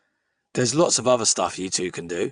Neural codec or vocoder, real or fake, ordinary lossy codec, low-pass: vocoder, 22.05 kHz, 80 mel bands, WaveNeXt; fake; none; 9.9 kHz